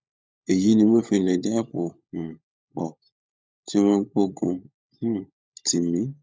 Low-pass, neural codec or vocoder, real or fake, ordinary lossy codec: none; codec, 16 kHz, 16 kbps, FunCodec, trained on LibriTTS, 50 frames a second; fake; none